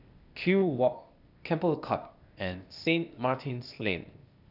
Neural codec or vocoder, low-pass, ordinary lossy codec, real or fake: codec, 16 kHz, 0.8 kbps, ZipCodec; 5.4 kHz; none; fake